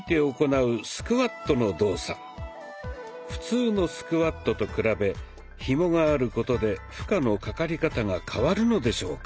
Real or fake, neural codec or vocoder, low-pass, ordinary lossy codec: real; none; none; none